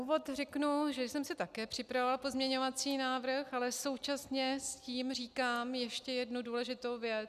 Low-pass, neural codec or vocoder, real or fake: 14.4 kHz; none; real